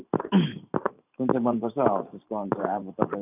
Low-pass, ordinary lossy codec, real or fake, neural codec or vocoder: 3.6 kHz; none; fake; vocoder, 44.1 kHz, 128 mel bands every 512 samples, BigVGAN v2